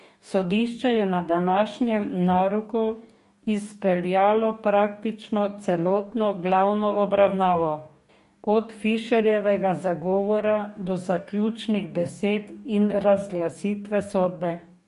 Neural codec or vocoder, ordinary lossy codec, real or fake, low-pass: codec, 44.1 kHz, 2.6 kbps, DAC; MP3, 48 kbps; fake; 14.4 kHz